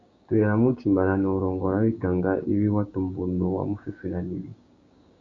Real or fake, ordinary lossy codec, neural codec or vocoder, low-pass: fake; Opus, 64 kbps; codec, 16 kHz, 6 kbps, DAC; 7.2 kHz